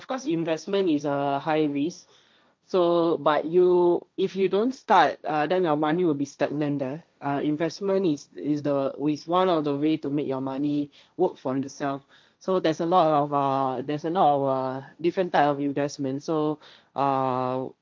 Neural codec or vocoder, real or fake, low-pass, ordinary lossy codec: codec, 16 kHz, 1.1 kbps, Voila-Tokenizer; fake; none; none